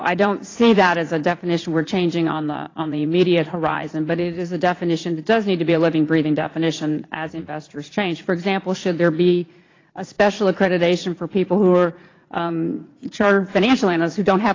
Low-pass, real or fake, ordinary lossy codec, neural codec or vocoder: 7.2 kHz; fake; AAC, 32 kbps; vocoder, 44.1 kHz, 80 mel bands, Vocos